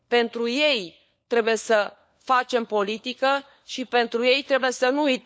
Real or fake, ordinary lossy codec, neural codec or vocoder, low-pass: fake; none; codec, 16 kHz, 4 kbps, FunCodec, trained on LibriTTS, 50 frames a second; none